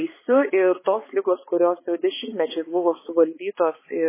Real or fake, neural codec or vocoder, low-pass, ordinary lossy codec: fake; codec, 16 kHz, 4 kbps, X-Codec, HuBERT features, trained on balanced general audio; 3.6 kHz; MP3, 16 kbps